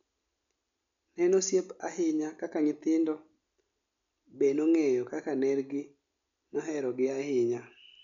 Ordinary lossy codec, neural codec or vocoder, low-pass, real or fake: none; none; 7.2 kHz; real